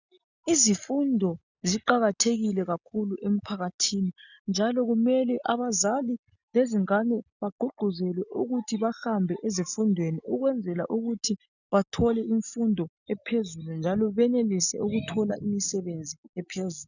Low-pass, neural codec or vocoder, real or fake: 7.2 kHz; none; real